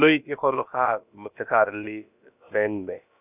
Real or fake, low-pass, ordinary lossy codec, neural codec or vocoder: fake; 3.6 kHz; none; codec, 16 kHz, about 1 kbps, DyCAST, with the encoder's durations